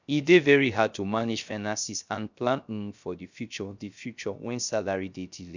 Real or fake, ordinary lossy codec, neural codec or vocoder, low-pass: fake; none; codec, 16 kHz, 0.3 kbps, FocalCodec; 7.2 kHz